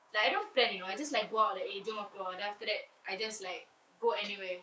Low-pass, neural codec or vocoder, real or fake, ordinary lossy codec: none; codec, 16 kHz, 6 kbps, DAC; fake; none